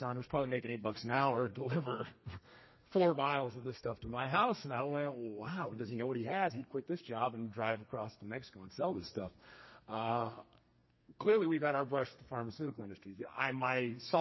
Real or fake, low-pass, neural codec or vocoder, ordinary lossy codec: fake; 7.2 kHz; codec, 32 kHz, 1.9 kbps, SNAC; MP3, 24 kbps